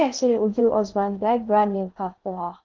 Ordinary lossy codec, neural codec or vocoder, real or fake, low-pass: Opus, 32 kbps; codec, 16 kHz in and 24 kHz out, 0.6 kbps, FocalCodec, streaming, 4096 codes; fake; 7.2 kHz